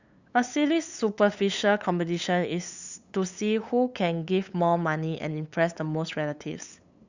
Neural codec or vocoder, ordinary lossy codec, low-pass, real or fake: codec, 16 kHz, 8 kbps, FunCodec, trained on LibriTTS, 25 frames a second; Opus, 64 kbps; 7.2 kHz; fake